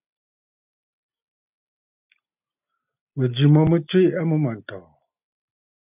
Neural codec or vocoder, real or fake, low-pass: none; real; 3.6 kHz